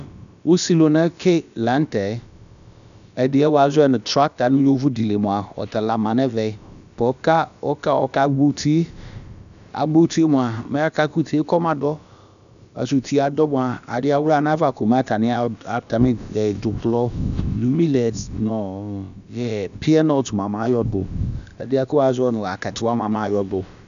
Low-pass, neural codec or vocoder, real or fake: 7.2 kHz; codec, 16 kHz, about 1 kbps, DyCAST, with the encoder's durations; fake